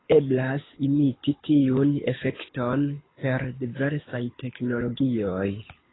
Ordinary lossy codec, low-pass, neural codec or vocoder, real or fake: AAC, 16 kbps; 7.2 kHz; codec, 24 kHz, 6 kbps, HILCodec; fake